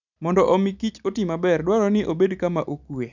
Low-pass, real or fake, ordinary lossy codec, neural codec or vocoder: 7.2 kHz; real; none; none